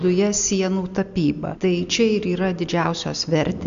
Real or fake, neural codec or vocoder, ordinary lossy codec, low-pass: real; none; MP3, 64 kbps; 7.2 kHz